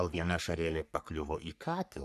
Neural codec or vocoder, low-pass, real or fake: codec, 44.1 kHz, 3.4 kbps, Pupu-Codec; 14.4 kHz; fake